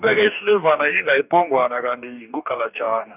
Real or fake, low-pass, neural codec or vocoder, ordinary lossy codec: fake; 3.6 kHz; codec, 44.1 kHz, 2.6 kbps, DAC; none